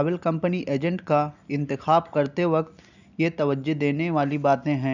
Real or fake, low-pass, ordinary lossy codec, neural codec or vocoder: real; 7.2 kHz; none; none